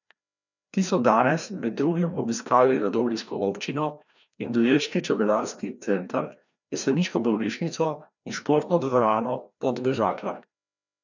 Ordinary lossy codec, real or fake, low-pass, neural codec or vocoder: none; fake; 7.2 kHz; codec, 16 kHz, 1 kbps, FreqCodec, larger model